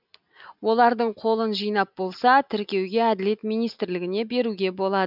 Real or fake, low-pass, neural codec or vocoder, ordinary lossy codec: real; 5.4 kHz; none; none